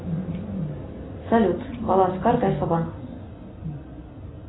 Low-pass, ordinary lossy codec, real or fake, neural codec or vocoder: 7.2 kHz; AAC, 16 kbps; real; none